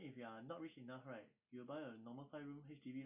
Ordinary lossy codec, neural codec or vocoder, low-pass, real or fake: none; none; 3.6 kHz; real